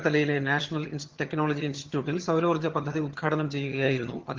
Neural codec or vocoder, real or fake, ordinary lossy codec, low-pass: vocoder, 22.05 kHz, 80 mel bands, HiFi-GAN; fake; Opus, 16 kbps; 7.2 kHz